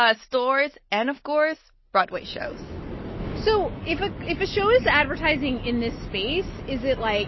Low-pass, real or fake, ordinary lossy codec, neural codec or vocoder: 7.2 kHz; real; MP3, 24 kbps; none